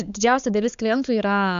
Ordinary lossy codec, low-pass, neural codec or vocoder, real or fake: Opus, 64 kbps; 7.2 kHz; codec, 16 kHz, 4 kbps, X-Codec, HuBERT features, trained on balanced general audio; fake